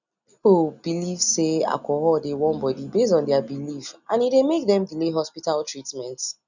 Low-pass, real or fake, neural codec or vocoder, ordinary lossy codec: 7.2 kHz; real; none; none